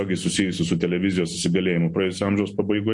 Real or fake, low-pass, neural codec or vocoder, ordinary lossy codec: real; 10.8 kHz; none; MP3, 48 kbps